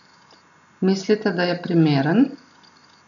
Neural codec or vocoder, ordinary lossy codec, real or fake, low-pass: none; none; real; 7.2 kHz